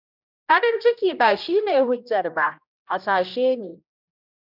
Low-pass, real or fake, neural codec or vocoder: 5.4 kHz; fake; codec, 16 kHz, 1 kbps, X-Codec, HuBERT features, trained on general audio